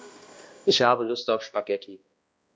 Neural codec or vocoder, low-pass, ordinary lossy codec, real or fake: codec, 16 kHz, 1 kbps, X-Codec, HuBERT features, trained on balanced general audio; none; none; fake